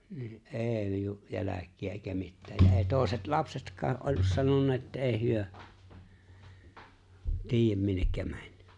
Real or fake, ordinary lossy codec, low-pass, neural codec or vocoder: real; none; 10.8 kHz; none